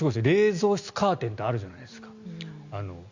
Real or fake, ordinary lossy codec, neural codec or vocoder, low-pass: real; Opus, 64 kbps; none; 7.2 kHz